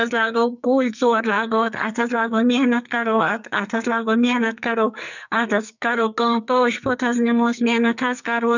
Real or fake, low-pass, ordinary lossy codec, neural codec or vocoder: fake; 7.2 kHz; none; codec, 44.1 kHz, 2.6 kbps, SNAC